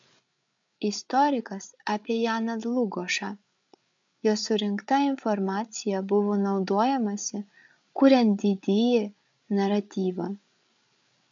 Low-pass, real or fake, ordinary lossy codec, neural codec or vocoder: 7.2 kHz; fake; MP3, 64 kbps; codec, 16 kHz, 8 kbps, FreqCodec, larger model